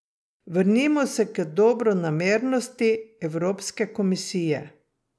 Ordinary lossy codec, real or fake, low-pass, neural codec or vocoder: none; real; none; none